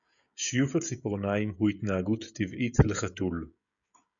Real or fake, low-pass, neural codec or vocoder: fake; 7.2 kHz; codec, 16 kHz, 16 kbps, FreqCodec, smaller model